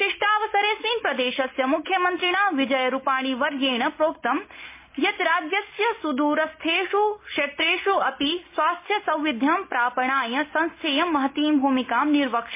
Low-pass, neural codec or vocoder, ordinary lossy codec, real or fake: 3.6 kHz; none; MP3, 24 kbps; real